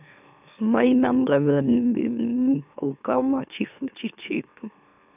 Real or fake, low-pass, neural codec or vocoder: fake; 3.6 kHz; autoencoder, 44.1 kHz, a latent of 192 numbers a frame, MeloTTS